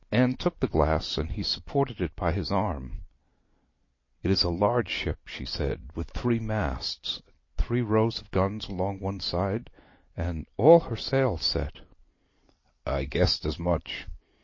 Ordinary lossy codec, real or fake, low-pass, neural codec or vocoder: MP3, 32 kbps; real; 7.2 kHz; none